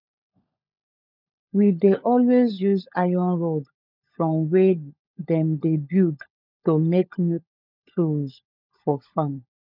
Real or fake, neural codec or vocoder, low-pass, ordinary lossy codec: fake; codec, 16 kHz, 16 kbps, FunCodec, trained on LibriTTS, 50 frames a second; 5.4 kHz; none